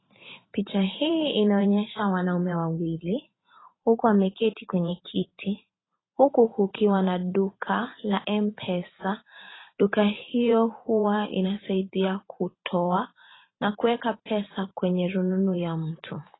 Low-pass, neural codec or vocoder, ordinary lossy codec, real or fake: 7.2 kHz; vocoder, 44.1 kHz, 128 mel bands every 512 samples, BigVGAN v2; AAC, 16 kbps; fake